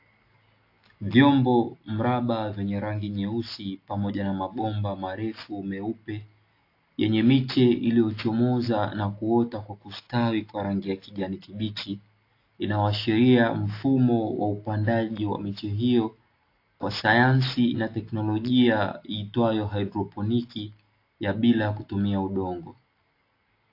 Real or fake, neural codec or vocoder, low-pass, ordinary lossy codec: real; none; 5.4 kHz; AAC, 32 kbps